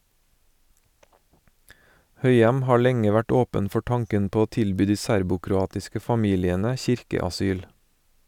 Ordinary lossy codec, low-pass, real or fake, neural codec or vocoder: none; 19.8 kHz; real; none